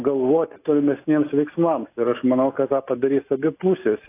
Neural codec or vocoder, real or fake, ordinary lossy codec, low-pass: none; real; AAC, 24 kbps; 3.6 kHz